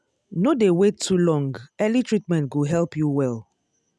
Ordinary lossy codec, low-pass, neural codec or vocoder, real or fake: none; none; none; real